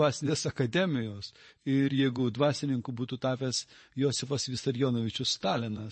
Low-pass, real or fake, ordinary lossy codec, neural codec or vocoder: 10.8 kHz; fake; MP3, 32 kbps; vocoder, 24 kHz, 100 mel bands, Vocos